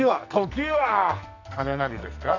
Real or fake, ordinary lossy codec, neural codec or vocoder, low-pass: fake; none; codec, 44.1 kHz, 2.6 kbps, SNAC; 7.2 kHz